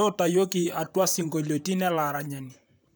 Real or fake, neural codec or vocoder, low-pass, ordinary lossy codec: fake; vocoder, 44.1 kHz, 128 mel bands, Pupu-Vocoder; none; none